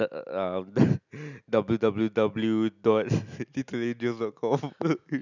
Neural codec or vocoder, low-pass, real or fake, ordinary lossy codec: none; 7.2 kHz; real; none